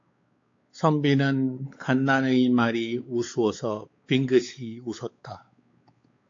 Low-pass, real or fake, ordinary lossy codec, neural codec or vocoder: 7.2 kHz; fake; AAC, 48 kbps; codec, 16 kHz, 4 kbps, X-Codec, WavLM features, trained on Multilingual LibriSpeech